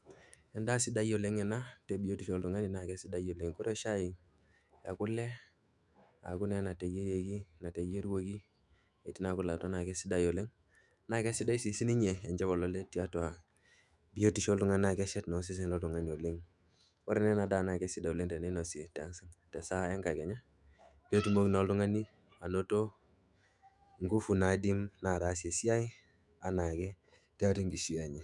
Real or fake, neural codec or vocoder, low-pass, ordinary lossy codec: fake; autoencoder, 48 kHz, 128 numbers a frame, DAC-VAE, trained on Japanese speech; 10.8 kHz; none